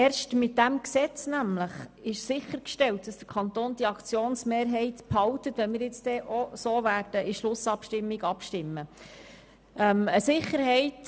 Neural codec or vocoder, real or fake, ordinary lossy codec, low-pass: none; real; none; none